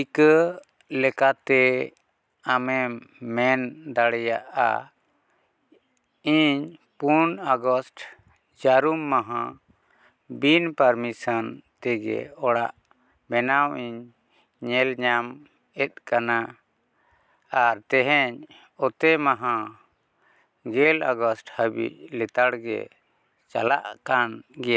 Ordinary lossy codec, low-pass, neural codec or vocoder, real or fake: none; none; none; real